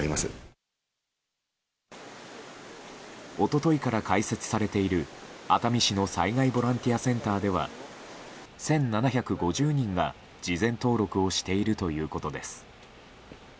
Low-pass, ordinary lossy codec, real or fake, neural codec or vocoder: none; none; real; none